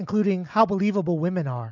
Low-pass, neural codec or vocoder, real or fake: 7.2 kHz; none; real